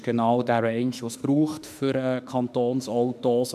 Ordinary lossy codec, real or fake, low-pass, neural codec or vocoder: none; fake; 14.4 kHz; autoencoder, 48 kHz, 32 numbers a frame, DAC-VAE, trained on Japanese speech